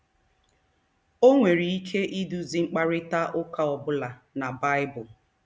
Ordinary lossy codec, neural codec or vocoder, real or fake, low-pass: none; none; real; none